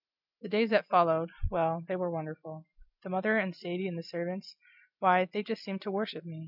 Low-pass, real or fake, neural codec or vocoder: 5.4 kHz; real; none